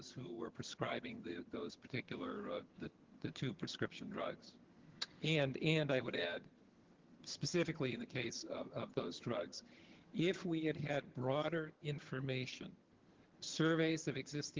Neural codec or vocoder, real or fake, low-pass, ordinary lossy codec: vocoder, 22.05 kHz, 80 mel bands, HiFi-GAN; fake; 7.2 kHz; Opus, 16 kbps